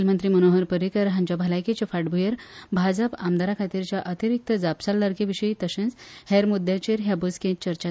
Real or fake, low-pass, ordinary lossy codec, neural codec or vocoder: real; none; none; none